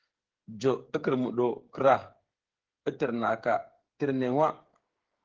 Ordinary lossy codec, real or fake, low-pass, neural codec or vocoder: Opus, 16 kbps; fake; 7.2 kHz; codec, 16 kHz, 8 kbps, FreqCodec, smaller model